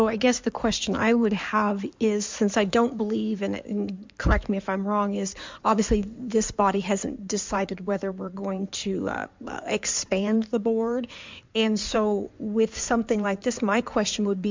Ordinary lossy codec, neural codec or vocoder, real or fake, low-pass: MP3, 64 kbps; codec, 16 kHz in and 24 kHz out, 2.2 kbps, FireRedTTS-2 codec; fake; 7.2 kHz